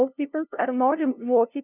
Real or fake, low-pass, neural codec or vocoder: fake; 3.6 kHz; codec, 16 kHz, 0.5 kbps, FunCodec, trained on LibriTTS, 25 frames a second